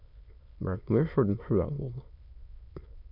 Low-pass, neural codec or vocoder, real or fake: 5.4 kHz; autoencoder, 22.05 kHz, a latent of 192 numbers a frame, VITS, trained on many speakers; fake